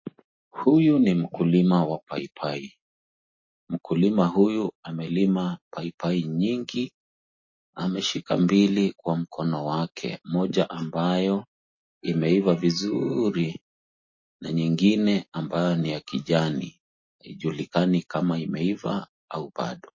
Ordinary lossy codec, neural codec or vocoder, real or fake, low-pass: MP3, 32 kbps; none; real; 7.2 kHz